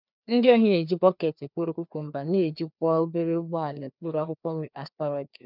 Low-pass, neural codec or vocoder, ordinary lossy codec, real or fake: 5.4 kHz; codec, 16 kHz, 2 kbps, FreqCodec, larger model; none; fake